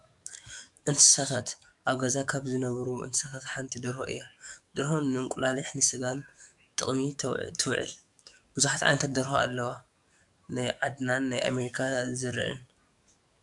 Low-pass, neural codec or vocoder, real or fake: 10.8 kHz; codec, 44.1 kHz, 7.8 kbps, DAC; fake